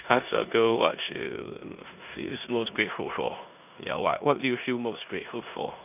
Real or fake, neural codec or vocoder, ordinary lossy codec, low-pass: fake; codec, 16 kHz in and 24 kHz out, 0.9 kbps, LongCat-Audio-Codec, four codebook decoder; none; 3.6 kHz